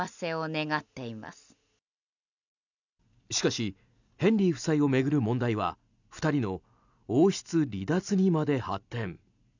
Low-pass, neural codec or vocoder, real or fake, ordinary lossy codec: 7.2 kHz; none; real; none